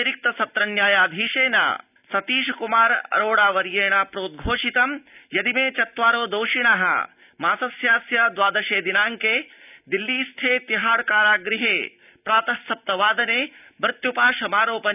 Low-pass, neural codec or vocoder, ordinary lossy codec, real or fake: 3.6 kHz; none; none; real